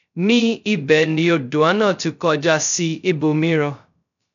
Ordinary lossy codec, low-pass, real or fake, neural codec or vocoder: none; 7.2 kHz; fake; codec, 16 kHz, 0.2 kbps, FocalCodec